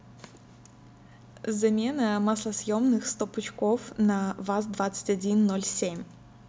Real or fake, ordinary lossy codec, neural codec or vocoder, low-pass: real; none; none; none